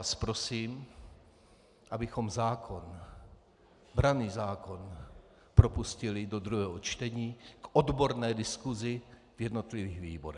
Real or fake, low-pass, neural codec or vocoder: real; 10.8 kHz; none